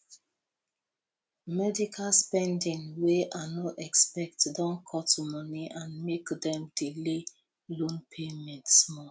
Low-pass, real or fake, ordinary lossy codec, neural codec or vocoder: none; real; none; none